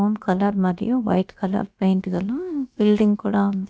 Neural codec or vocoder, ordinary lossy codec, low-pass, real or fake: codec, 16 kHz, about 1 kbps, DyCAST, with the encoder's durations; none; none; fake